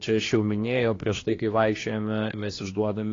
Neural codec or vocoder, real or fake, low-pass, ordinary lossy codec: codec, 16 kHz, 2 kbps, X-Codec, HuBERT features, trained on general audio; fake; 7.2 kHz; AAC, 32 kbps